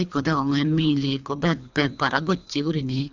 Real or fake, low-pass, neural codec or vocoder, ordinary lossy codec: fake; 7.2 kHz; codec, 24 kHz, 3 kbps, HILCodec; none